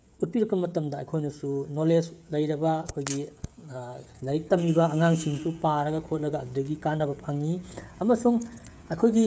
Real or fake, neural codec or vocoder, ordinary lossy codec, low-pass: fake; codec, 16 kHz, 16 kbps, FreqCodec, smaller model; none; none